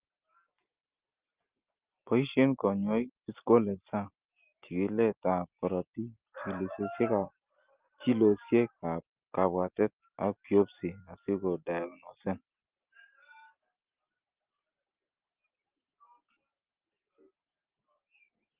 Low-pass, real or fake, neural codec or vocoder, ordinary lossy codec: 3.6 kHz; real; none; Opus, 32 kbps